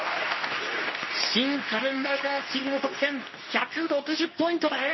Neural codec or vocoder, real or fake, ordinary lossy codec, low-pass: codec, 24 kHz, 0.9 kbps, WavTokenizer, medium speech release version 2; fake; MP3, 24 kbps; 7.2 kHz